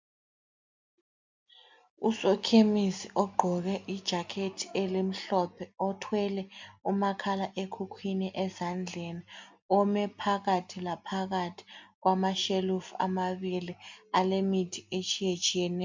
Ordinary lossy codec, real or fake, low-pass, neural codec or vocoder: MP3, 64 kbps; real; 7.2 kHz; none